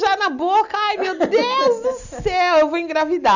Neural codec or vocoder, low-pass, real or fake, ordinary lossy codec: none; 7.2 kHz; real; none